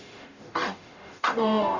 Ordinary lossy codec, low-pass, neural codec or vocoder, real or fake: none; 7.2 kHz; codec, 44.1 kHz, 0.9 kbps, DAC; fake